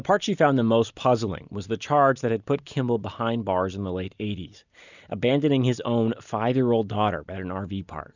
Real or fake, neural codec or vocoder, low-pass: real; none; 7.2 kHz